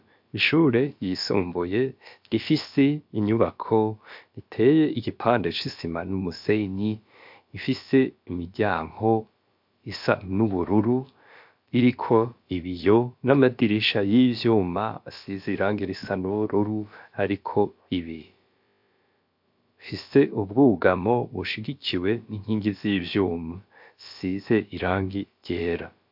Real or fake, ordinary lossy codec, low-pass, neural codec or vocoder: fake; MP3, 48 kbps; 5.4 kHz; codec, 16 kHz, about 1 kbps, DyCAST, with the encoder's durations